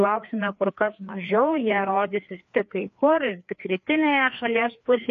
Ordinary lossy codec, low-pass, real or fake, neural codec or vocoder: AAC, 48 kbps; 7.2 kHz; fake; codec, 16 kHz, 2 kbps, FreqCodec, larger model